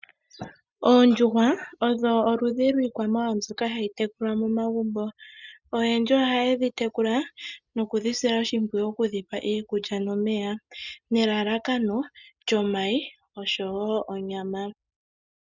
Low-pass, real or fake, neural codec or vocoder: 7.2 kHz; real; none